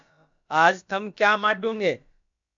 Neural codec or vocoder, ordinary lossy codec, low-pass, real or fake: codec, 16 kHz, about 1 kbps, DyCAST, with the encoder's durations; MP3, 64 kbps; 7.2 kHz; fake